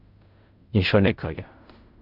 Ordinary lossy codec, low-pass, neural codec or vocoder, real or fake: none; 5.4 kHz; codec, 16 kHz in and 24 kHz out, 0.4 kbps, LongCat-Audio-Codec, fine tuned four codebook decoder; fake